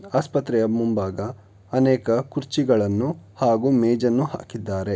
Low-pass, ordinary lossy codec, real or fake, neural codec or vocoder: none; none; real; none